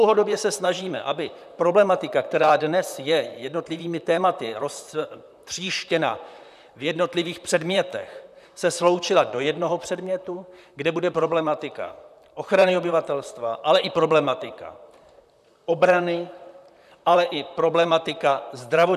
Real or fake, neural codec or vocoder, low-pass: fake; vocoder, 44.1 kHz, 128 mel bands, Pupu-Vocoder; 14.4 kHz